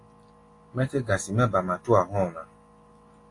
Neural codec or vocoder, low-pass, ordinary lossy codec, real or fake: none; 10.8 kHz; AAC, 48 kbps; real